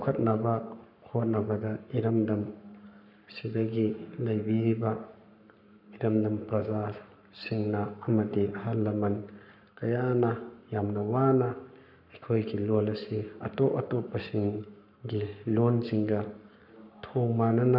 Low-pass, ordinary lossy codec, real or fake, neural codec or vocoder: 5.4 kHz; none; fake; codec, 44.1 kHz, 7.8 kbps, Pupu-Codec